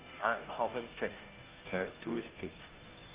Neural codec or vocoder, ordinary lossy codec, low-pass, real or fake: codec, 16 kHz, 0.5 kbps, FunCodec, trained on Chinese and English, 25 frames a second; Opus, 32 kbps; 3.6 kHz; fake